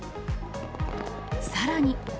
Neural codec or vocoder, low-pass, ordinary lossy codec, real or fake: none; none; none; real